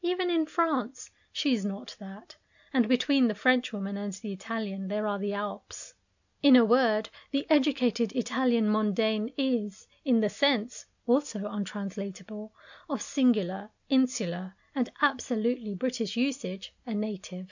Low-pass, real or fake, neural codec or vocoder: 7.2 kHz; real; none